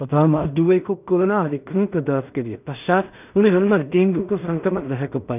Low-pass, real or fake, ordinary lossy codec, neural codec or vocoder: 3.6 kHz; fake; none; codec, 16 kHz in and 24 kHz out, 0.4 kbps, LongCat-Audio-Codec, two codebook decoder